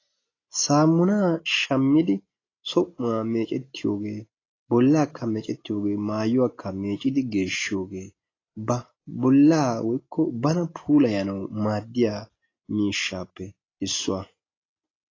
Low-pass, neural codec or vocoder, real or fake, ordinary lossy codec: 7.2 kHz; none; real; AAC, 32 kbps